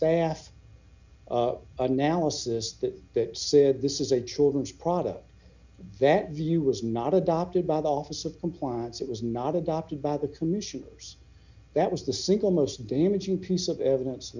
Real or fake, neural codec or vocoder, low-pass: real; none; 7.2 kHz